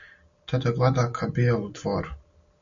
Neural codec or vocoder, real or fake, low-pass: none; real; 7.2 kHz